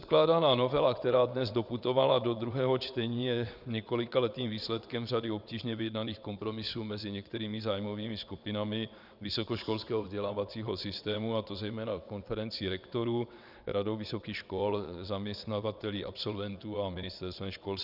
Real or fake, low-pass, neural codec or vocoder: fake; 5.4 kHz; vocoder, 22.05 kHz, 80 mel bands, WaveNeXt